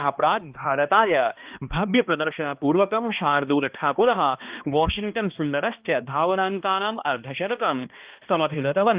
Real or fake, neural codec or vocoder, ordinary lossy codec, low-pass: fake; codec, 16 kHz, 1 kbps, X-Codec, HuBERT features, trained on balanced general audio; Opus, 24 kbps; 3.6 kHz